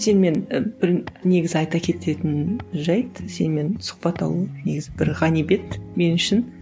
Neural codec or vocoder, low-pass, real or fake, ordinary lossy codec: none; none; real; none